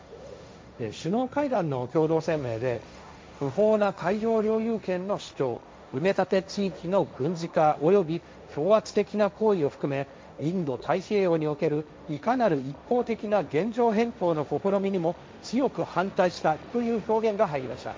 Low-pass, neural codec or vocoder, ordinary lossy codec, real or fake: none; codec, 16 kHz, 1.1 kbps, Voila-Tokenizer; none; fake